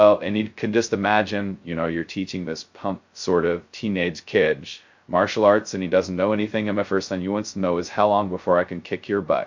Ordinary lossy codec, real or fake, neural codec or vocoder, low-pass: MP3, 48 kbps; fake; codec, 16 kHz, 0.2 kbps, FocalCodec; 7.2 kHz